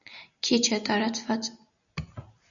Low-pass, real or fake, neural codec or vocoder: 7.2 kHz; real; none